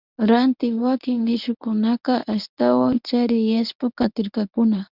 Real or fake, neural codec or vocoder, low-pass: fake; codec, 24 kHz, 0.9 kbps, WavTokenizer, medium speech release version 1; 5.4 kHz